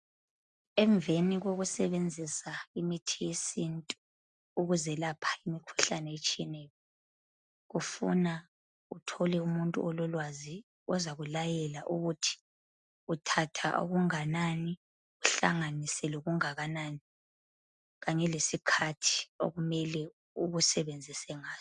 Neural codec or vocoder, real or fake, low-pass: none; real; 9.9 kHz